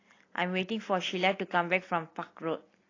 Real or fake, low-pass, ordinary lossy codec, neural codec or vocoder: real; 7.2 kHz; AAC, 32 kbps; none